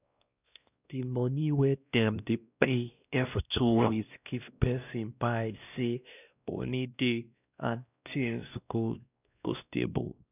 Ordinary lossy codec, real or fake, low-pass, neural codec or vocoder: none; fake; 3.6 kHz; codec, 16 kHz, 1 kbps, X-Codec, WavLM features, trained on Multilingual LibriSpeech